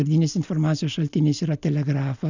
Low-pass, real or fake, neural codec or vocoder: 7.2 kHz; real; none